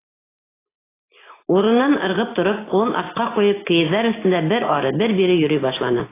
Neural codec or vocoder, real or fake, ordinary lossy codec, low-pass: none; real; AAC, 16 kbps; 3.6 kHz